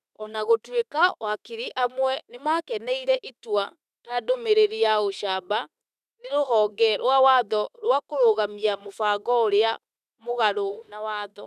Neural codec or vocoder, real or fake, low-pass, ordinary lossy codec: autoencoder, 48 kHz, 32 numbers a frame, DAC-VAE, trained on Japanese speech; fake; 14.4 kHz; none